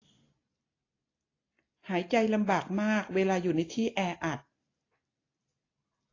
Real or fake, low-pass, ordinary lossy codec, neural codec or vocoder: real; 7.2 kHz; AAC, 32 kbps; none